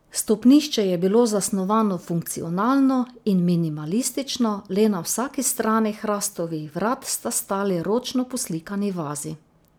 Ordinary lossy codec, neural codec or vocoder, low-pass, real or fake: none; none; none; real